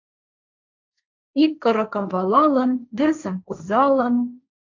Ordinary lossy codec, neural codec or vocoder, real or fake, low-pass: AAC, 48 kbps; codec, 16 kHz, 1.1 kbps, Voila-Tokenizer; fake; 7.2 kHz